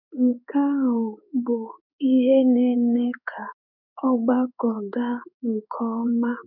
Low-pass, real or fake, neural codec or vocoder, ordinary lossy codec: 5.4 kHz; fake; codec, 16 kHz in and 24 kHz out, 1 kbps, XY-Tokenizer; none